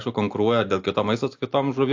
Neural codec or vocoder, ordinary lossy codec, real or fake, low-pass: none; AAC, 48 kbps; real; 7.2 kHz